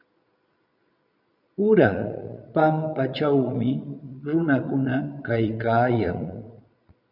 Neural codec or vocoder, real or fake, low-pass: vocoder, 22.05 kHz, 80 mel bands, Vocos; fake; 5.4 kHz